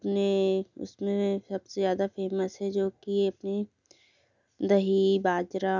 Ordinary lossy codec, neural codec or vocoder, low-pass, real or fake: none; none; 7.2 kHz; real